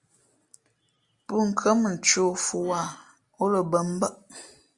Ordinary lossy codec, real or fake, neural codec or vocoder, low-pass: Opus, 64 kbps; real; none; 10.8 kHz